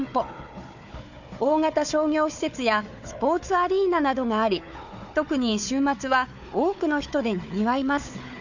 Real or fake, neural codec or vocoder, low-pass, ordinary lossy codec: fake; codec, 16 kHz, 4 kbps, FunCodec, trained on Chinese and English, 50 frames a second; 7.2 kHz; none